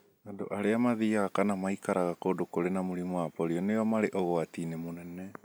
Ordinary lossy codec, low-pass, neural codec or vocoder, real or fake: none; none; none; real